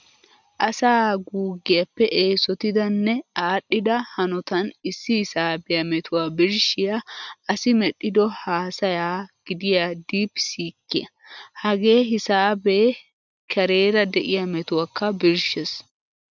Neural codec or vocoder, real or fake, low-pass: none; real; 7.2 kHz